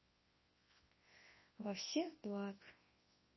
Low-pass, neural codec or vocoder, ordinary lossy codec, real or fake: 7.2 kHz; codec, 24 kHz, 0.9 kbps, WavTokenizer, large speech release; MP3, 24 kbps; fake